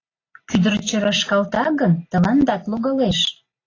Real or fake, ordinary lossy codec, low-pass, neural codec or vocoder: real; AAC, 32 kbps; 7.2 kHz; none